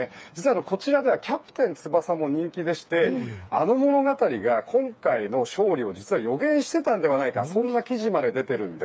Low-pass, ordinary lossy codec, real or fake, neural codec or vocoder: none; none; fake; codec, 16 kHz, 4 kbps, FreqCodec, smaller model